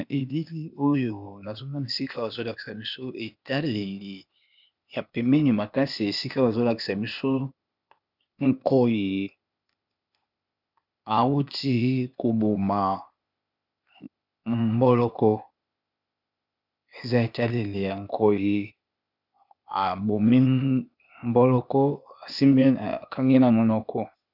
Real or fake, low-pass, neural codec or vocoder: fake; 5.4 kHz; codec, 16 kHz, 0.8 kbps, ZipCodec